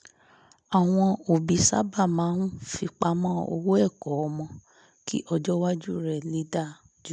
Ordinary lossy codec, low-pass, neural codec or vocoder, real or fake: none; 9.9 kHz; none; real